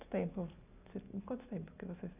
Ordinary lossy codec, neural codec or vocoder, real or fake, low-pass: none; none; real; 3.6 kHz